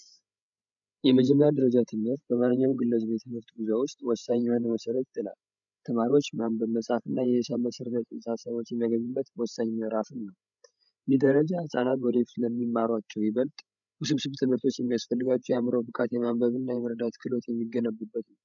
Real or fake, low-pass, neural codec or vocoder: fake; 7.2 kHz; codec, 16 kHz, 16 kbps, FreqCodec, larger model